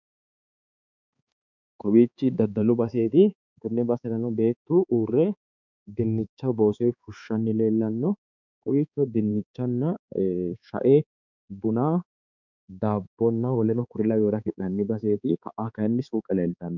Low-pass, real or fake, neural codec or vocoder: 7.2 kHz; fake; codec, 16 kHz, 4 kbps, X-Codec, HuBERT features, trained on balanced general audio